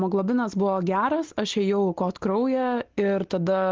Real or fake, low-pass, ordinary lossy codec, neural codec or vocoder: real; 7.2 kHz; Opus, 16 kbps; none